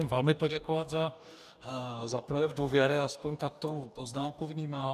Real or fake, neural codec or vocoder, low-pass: fake; codec, 44.1 kHz, 2.6 kbps, DAC; 14.4 kHz